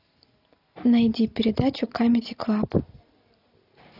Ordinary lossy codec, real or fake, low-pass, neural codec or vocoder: AAC, 32 kbps; real; 5.4 kHz; none